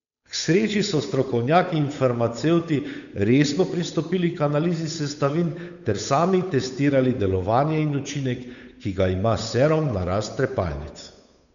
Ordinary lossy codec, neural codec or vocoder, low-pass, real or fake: none; codec, 16 kHz, 8 kbps, FunCodec, trained on Chinese and English, 25 frames a second; 7.2 kHz; fake